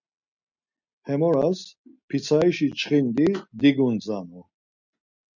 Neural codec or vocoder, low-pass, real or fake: none; 7.2 kHz; real